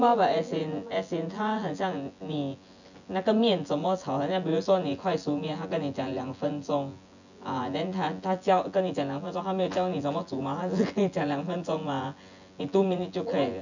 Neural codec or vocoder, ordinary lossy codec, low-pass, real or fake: vocoder, 24 kHz, 100 mel bands, Vocos; none; 7.2 kHz; fake